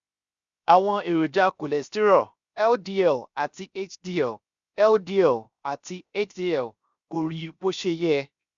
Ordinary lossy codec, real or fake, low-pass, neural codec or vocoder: Opus, 64 kbps; fake; 7.2 kHz; codec, 16 kHz, 0.7 kbps, FocalCodec